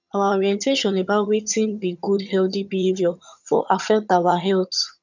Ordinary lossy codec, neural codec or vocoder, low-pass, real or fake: none; vocoder, 22.05 kHz, 80 mel bands, HiFi-GAN; 7.2 kHz; fake